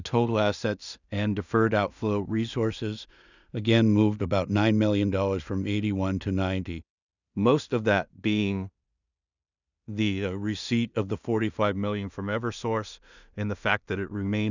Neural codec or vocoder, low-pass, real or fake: codec, 16 kHz in and 24 kHz out, 0.4 kbps, LongCat-Audio-Codec, two codebook decoder; 7.2 kHz; fake